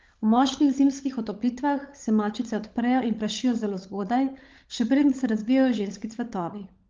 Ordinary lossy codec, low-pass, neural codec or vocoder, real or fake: Opus, 24 kbps; 7.2 kHz; codec, 16 kHz, 16 kbps, FunCodec, trained on LibriTTS, 50 frames a second; fake